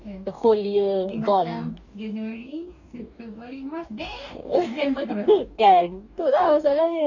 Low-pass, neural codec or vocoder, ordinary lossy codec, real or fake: 7.2 kHz; codec, 44.1 kHz, 2.6 kbps, DAC; none; fake